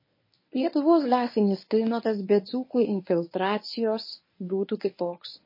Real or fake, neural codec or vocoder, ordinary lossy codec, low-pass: fake; codec, 24 kHz, 0.9 kbps, WavTokenizer, medium speech release version 1; MP3, 24 kbps; 5.4 kHz